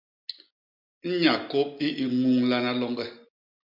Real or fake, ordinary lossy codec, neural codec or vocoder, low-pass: real; MP3, 48 kbps; none; 5.4 kHz